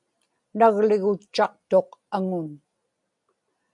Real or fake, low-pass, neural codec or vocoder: real; 10.8 kHz; none